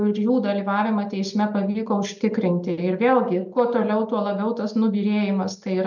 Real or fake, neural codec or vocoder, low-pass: real; none; 7.2 kHz